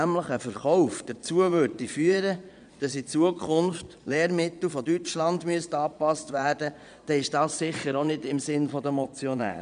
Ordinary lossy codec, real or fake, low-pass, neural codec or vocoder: none; fake; 9.9 kHz; vocoder, 22.05 kHz, 80 mel bands, Vocos